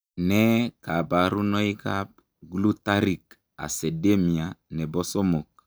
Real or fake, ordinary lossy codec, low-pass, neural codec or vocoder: real; none; none; none